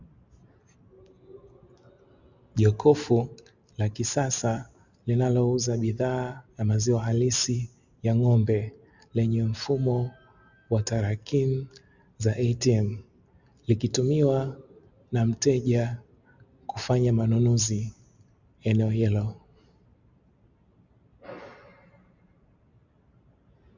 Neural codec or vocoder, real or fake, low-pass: none; real; 7.2 kHz